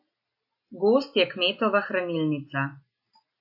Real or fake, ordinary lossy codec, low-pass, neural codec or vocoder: real; AAC, 48 kbps; 5.4 kHz; none